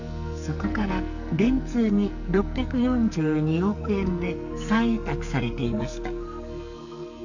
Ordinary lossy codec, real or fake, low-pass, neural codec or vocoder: none; fake; 7.2 kHz; codec, 44.1 kHz, 2.6 kbps, SNAC